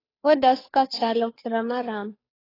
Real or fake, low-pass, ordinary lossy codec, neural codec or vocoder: fake; 5.4 kHz; AAC, 24 kbps; codec, 16 kHz, 2 kbps, FunCodec, trained on Chinese and English, 25 frames a second